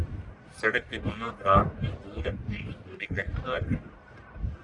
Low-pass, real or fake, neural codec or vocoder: 10.8 kHz; fake; codec, 44.1 kHz, 1.7 kbps, Pupu-Codec